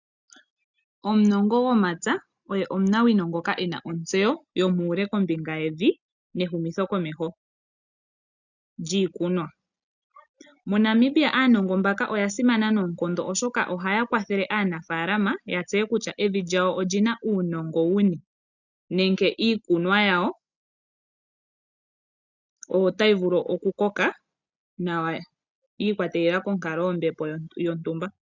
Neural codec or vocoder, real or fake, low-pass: none; real; 7.2 kHz